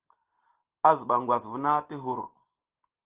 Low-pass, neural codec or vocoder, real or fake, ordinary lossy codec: 3.6 kHz; none; real; Opus, 16 kbps